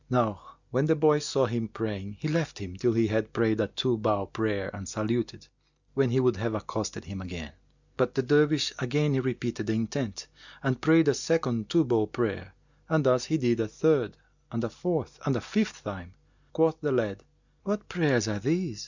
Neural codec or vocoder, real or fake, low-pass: none; real; 7.2 kHz